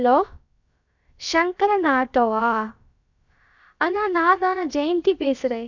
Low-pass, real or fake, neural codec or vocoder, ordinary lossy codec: 7.2 kHz; fake; codec, 16 kHz, about 1 kbps, DyCAST, with the encoder's durations; none